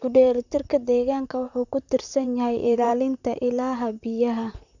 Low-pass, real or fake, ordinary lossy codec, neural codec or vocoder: 7.2 kHz; fake; none; vocoder, 44.1 kHz, 128 mel bands, Pupu-Vocoder